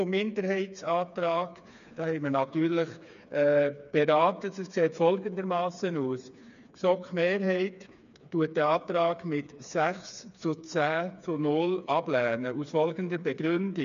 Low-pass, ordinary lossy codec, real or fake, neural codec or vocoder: 7.2 kHz; MP3, 96 kbps; fake; codec, 16 kHz, 4 kbps, FreqCodec, smaller model